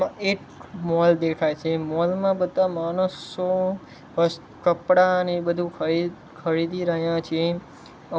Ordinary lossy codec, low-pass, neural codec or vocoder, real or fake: none; none; none; real